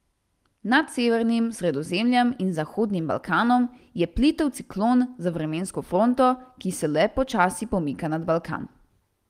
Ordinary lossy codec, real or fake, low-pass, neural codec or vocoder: Opus, 24 kbps; real; 14.4 kHz; none